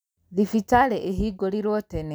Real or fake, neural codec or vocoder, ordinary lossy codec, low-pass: real; none; none; none